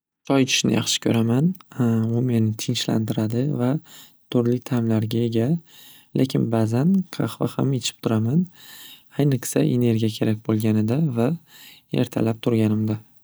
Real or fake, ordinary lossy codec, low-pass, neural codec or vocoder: real; none; none; none